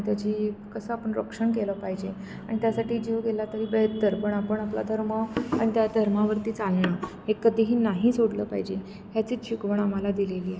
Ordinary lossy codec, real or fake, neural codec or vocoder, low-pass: none; real; none; none